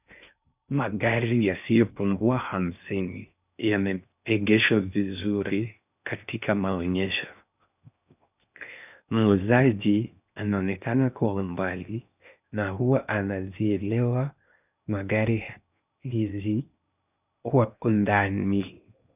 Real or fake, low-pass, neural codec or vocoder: fake; 3.6 kHz; codec, 16 kHz in and 24 kHz out, 0.6 kbps, FocalCodec, streaming, 4096 codes